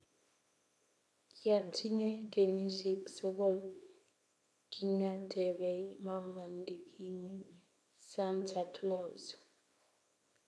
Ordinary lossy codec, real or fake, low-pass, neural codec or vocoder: none; fake; none; codec, 24 kHz, 0.9 kbps, WavTokenizer, small release